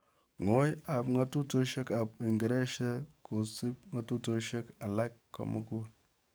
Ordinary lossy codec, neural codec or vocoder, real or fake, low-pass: none; codec, 44.1 kHz, 7.8 kbps, DAC; fake; none